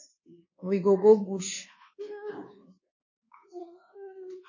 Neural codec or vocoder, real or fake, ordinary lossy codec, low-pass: codec, 24 kHz, 1.2 kbps, DualCodec; fake; MP3, 32 kbps; 7.2 kHz